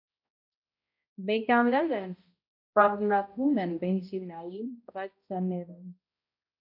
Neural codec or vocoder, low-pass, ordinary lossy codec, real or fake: codec, 16 kHz, 0.5 kbps, X-Codec, HuBERT features, trained on balanced general audio; 5.4 kHz; AAC, 32 kbps; fake